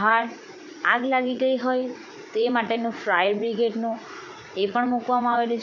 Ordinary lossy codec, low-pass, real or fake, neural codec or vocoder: none; 7.2 kHz; fake; vocoder, 44.1 kHz, 80 mel bands, Vocos